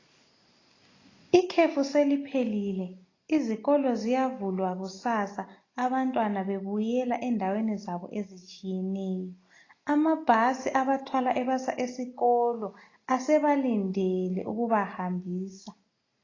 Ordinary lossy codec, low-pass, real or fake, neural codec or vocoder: AAC, 32 kbps; 7.2 kHz; real; none